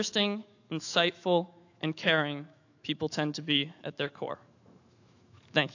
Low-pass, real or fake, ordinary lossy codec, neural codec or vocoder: 7.2 kHz; fake; AAC, 48 kbps; autoencoder, 48 kHz, 128 numbers a frame, DAC-VAE, trained on Japanese speech